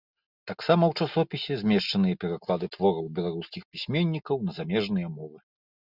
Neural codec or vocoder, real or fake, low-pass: none; real; 5.4 kHz